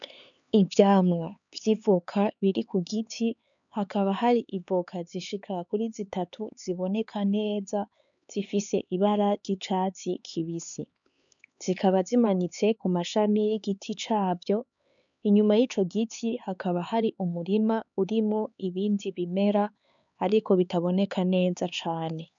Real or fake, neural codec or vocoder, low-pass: fake; codec, 16 kHz, 4 kbps, X-Codec, HuBERT features, trained on LibriSpeech; 7.2 kHz